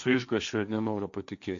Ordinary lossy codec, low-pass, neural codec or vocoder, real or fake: AAC, 64 kbps; 7.2 kHz; codec, 16 kHz, 1.1 kbps, Voila-Tokenizer; fake